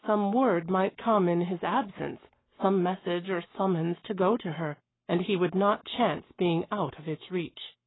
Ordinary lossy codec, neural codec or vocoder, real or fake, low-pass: AAC, 16 kbps; codec, 44.1 kHz, 7.8 kbps, Pupu-Codec; fake; 7.2 kHz